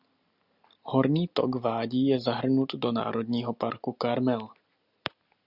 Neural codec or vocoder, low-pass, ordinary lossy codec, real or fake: none; 5.4 kHz; Opus, 64 kbps; real